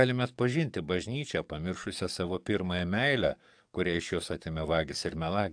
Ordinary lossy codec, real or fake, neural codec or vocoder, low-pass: AAC, 64 kbps; fake; codec, 44.1 kHz, 7.8 kbps, DAC; 9.9 kHz